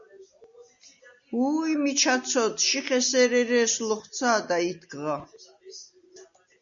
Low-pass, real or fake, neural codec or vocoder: 7.2 kHz; real; none